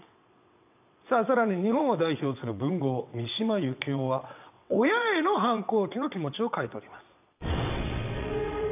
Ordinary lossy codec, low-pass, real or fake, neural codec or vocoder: none; 3.6 kHz; fake; vocoder, 22.05 kHz, 80 mel bands, WaveNeXt